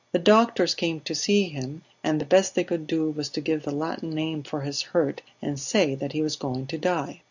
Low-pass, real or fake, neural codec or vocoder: 7.2 kHz; real; none